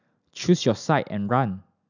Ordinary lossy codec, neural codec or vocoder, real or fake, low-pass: none; none; real; 7.2 kHz